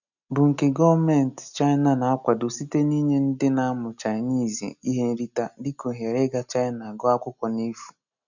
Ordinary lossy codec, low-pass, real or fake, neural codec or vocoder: none; 7.2 kHz; real; none